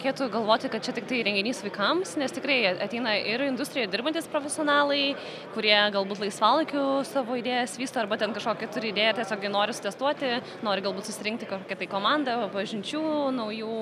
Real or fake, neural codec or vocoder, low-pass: real; none; 14.4 kHz